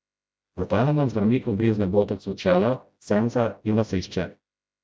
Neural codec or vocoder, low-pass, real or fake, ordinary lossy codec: codec, 16 kHz, 0.5 kbps, FreqCodec, smaller model; none; fake; none